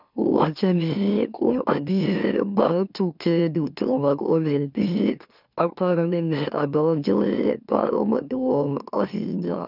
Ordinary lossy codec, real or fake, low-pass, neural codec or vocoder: none; fake; 5.4 kHz; autoencoder, 44.1 kHz, a latent of 192 numbers a frame, MeloTTS